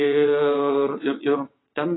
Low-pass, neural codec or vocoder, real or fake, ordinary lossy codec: 7.2 kHz; vocoder, 22.05 kHz, 80 mel bands, WaveNeXt; fake; AAC, 16 kbps